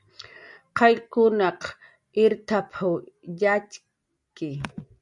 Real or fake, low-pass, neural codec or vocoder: real; 10.8 kHz; none